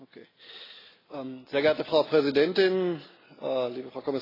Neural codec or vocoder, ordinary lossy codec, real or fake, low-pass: none; AAC, 24 kbps; real; 5.4 kHz